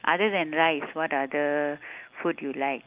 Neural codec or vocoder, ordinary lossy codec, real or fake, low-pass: none; Opus, 24 kbps; real; 3.6 kHz